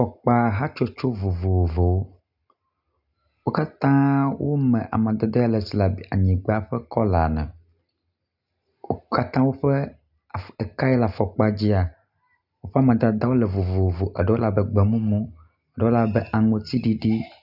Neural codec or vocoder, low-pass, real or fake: none; 5.4 kHz; real